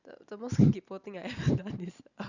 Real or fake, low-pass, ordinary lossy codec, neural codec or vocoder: real; 7.2 kHz; Opus, 64 kbps; none